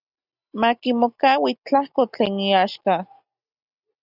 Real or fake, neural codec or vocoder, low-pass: real; none; 5.4 kHz